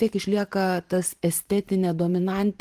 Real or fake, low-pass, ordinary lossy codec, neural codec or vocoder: fake; 14.4 kHz; Opus, 24 kbps; vocoder, 44.1 kHz, 128 mel bands every 512 samples, BigVGAN v2